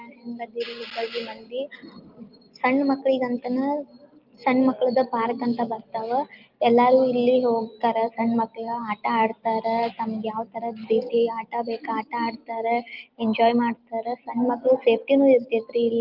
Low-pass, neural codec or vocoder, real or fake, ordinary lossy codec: 5.4 kHz; none; real; Opus, 32 kbps